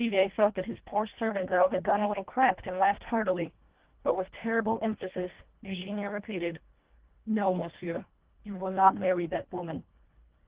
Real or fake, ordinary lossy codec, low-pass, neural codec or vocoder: fake; Opus, 16 kbps; 3.6 kHz; codec, 24 kHz, 1.5 kbps, HILCodec